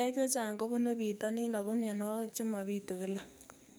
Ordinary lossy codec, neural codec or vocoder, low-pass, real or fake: none; codec, 44.1 kHz, 2.6 kbps, SNAC; none; fake